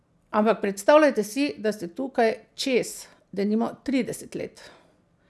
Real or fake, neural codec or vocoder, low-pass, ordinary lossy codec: real; none; none; none